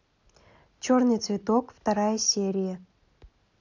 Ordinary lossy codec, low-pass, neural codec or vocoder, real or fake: none; 7.2 kHz; none; real